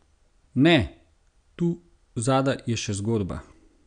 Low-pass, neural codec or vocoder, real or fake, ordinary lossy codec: 9.9 kHz; none; real; none